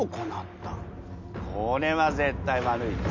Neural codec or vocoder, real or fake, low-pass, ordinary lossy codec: none; real; 7.2 kHz; MP3, 48 kbps